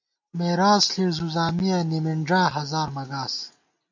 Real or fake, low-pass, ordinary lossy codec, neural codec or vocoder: real; 7.2 kHz; MP3, 48 kbps; none